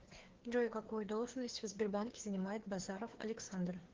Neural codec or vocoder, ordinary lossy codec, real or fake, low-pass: codec, 16 kHz, 2 kbps, FreqCodec, larger model; Opus, 16 kbps; fake; 7.2 kHz